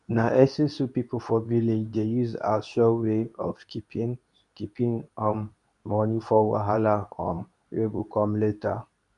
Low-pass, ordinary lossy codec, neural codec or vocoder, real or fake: 10.8 kHz; MP3, 96 kbps; codec, 24 kHz, 0.9 kbps, WavTokenizer, medium speech release version 2; fake